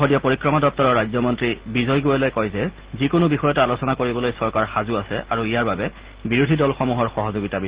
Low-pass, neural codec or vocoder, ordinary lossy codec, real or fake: 3.6 kHz; none; Opus, 16 kbps; real